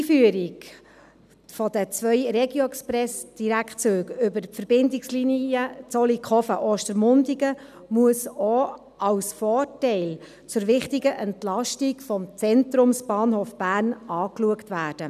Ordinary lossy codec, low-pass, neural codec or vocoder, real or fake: none; 14.4 kHz; none; real